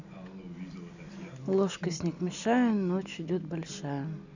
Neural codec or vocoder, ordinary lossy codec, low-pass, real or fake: none; none; 7.2 kHz; real